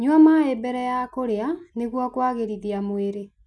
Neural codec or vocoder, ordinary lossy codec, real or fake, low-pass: none; none; real; none